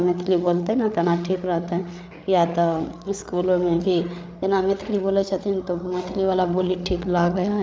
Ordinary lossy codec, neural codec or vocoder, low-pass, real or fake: none; codec, 16 kHz, 8 kbps, FreqCodec, larger model; none; fake